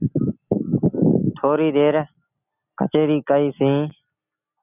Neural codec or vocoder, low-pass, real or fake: none; 3.6 kHz; real